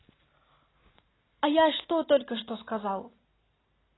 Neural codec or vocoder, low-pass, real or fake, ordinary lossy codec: none; 7.2 kHz; real; AAC, 16 kbps